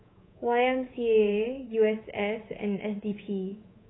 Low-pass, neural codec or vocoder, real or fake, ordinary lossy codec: 7.2 kHz; codec, 24 kHz, 3.1 kbps, DualCodec; fake; AAC, 16 kbps